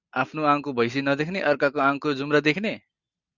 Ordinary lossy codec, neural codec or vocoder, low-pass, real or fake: Opus, 64 kbps; none; 7.2 kHz; real